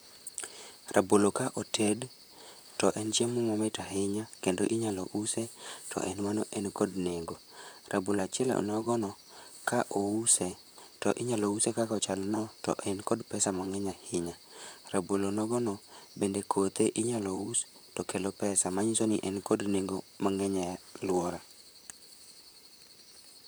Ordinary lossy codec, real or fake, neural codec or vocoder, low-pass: none; fake; vocoder, 44.1 kHz, 128 mel bands, Pupu-Vocoder; none